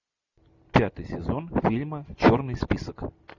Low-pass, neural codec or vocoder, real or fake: 7.2 kHz; none; real